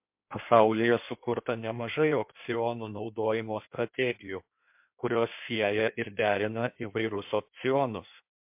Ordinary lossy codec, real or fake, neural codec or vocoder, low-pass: MP3, 32 kbps; fake; codec, 16 kHz in and 24 kHz out, 1.1 kbps, FireRedTTS-2 codec; 3.6 kHz